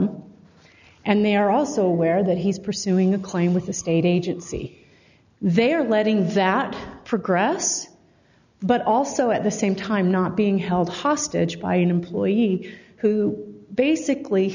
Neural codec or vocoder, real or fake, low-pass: none; real; 7.2 kHz